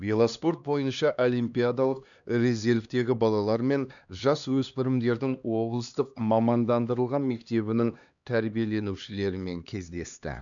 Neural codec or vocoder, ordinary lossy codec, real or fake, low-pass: codec, 16 kHz, 2 kbps, X-Codec, HuBERT features, trained on LibriSpeech; none; fake; 7.2 kHz